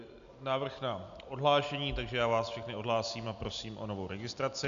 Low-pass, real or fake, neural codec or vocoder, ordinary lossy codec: 7.2 kHz; real; none; MP3, 96 kbps